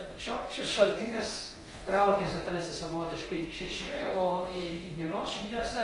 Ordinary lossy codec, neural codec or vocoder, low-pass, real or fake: AAC, 32 kbps; codec, 24 kHz, 0.9 kbps, DualCodec; 10.8 kHz; fake